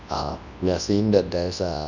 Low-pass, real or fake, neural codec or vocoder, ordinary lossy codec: 7.2 kHz; fake; codec, 24 kHz, 0.9 kbps, WavTokenizer, large speech release; none